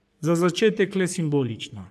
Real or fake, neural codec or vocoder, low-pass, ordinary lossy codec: fake; codec, 44.1 kHz, 3.4 kbps, Pupu-Codec; 14.4 kHz; AAC, 96 kbps